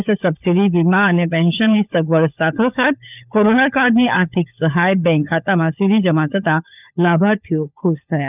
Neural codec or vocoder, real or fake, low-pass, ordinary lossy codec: codec, 16 kHz, 16 kbps, FunCodec, trained on LibriTTS, 50 frames a second; fake; 3.6 kHz; none